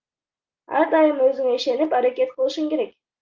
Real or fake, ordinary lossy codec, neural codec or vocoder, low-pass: real; Opus, 32 kbps; none; 7.2 kHz